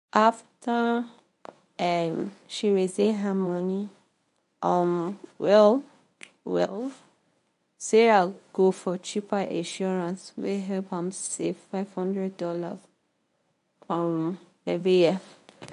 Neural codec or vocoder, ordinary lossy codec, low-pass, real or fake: codec, 24 kHz, 0.9 kbps, WavTokenizer, medium speech release version 1; AAC, 96 kbps; 10.8 kHz; fake